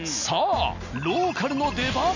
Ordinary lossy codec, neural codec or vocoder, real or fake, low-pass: none; none; real; 7.2 kHz